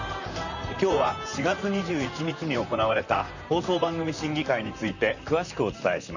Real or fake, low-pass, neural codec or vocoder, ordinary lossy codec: fake; 7.2 kHz; vocoder, 44.1 kHz, 128 mel bands, Pupu-Vocoder; none